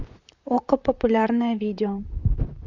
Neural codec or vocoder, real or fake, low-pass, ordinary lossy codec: vocoder, 44.1 kHz, 128 mel bands, Pupu-Vocoder; fake; 7.2 kHz; Opus, 64 kbps